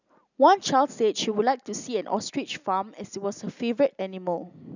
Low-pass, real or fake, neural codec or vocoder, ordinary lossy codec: 7.2 kHz; real; none; none